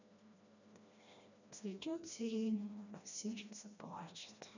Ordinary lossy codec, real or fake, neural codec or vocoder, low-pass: none; fake; codec, 16 kHz, 1 kbps, FreqCodec, smaller model; 7.2 kHz